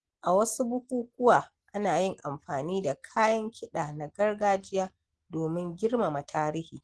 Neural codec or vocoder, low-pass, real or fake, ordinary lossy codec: none; 9.9 kHz; real; Opus, 16 kbps